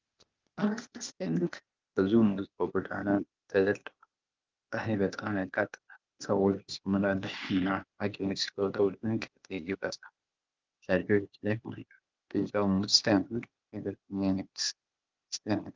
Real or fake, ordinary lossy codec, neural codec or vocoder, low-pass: fake; Opus, 32 kbps; codec, 16 kHz, 0.8 kbps, ZipCodec; 7.2 kHz